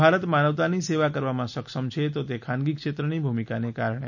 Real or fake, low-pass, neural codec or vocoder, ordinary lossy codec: real; 7.2 kHz; none; none